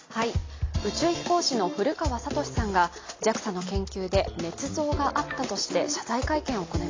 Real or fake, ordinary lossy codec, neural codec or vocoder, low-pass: real; AAC, 32 kbps; none; 7.2 kHz